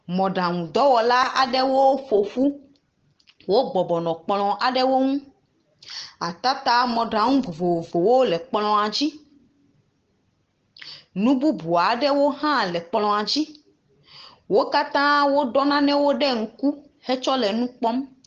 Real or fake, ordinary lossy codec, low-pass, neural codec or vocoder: real; Opus, 16 kbps; 7.2 kHz; none